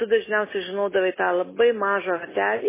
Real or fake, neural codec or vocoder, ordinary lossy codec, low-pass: real; none; MP3, 16 kbps; 3.6 kHz